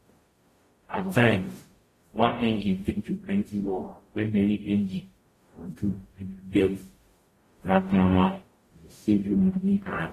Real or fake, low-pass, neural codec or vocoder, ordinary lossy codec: fake; 14.4 kHz; codec, 44.1 kHz, 0.9 kbps, DAC; AAC, 48 kbps